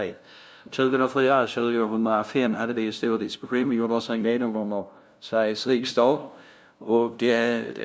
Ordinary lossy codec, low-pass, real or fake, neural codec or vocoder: none; none; fake; codec, 16 kHz, 0.5 kbps, FunCodec, trained on LibriTTS, 25 frames a second